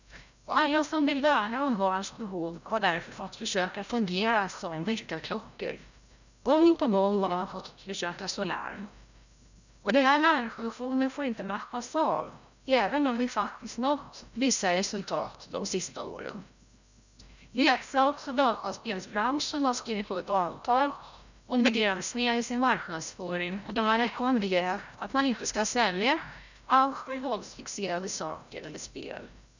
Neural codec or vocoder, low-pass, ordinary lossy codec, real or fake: codec, 16 kHz, 0.5 kbps, FreqCodec, larger model; 7.2 kHz; none; fake